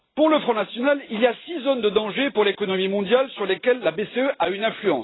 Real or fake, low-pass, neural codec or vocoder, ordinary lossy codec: real; 7.2 kHz; none; AAC, 16 kbps